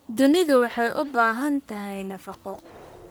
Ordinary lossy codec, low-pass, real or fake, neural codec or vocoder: none; none; fake; codec, 44.1 kHz, 1.7 kbps, Pupu-Codec